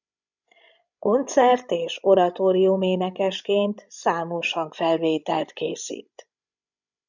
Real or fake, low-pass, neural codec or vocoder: fake; 7.2 kHz; codec, 16 kHz, 16 kbps, FreqCodec, larger model